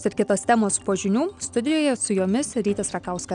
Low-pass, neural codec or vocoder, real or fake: 9.9 kHz; none; real